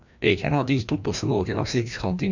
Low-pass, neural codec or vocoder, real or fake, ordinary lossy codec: 7.2 kHz; codec, 16 kHz, 1 kbps, FreqCodec, larger model; fake; none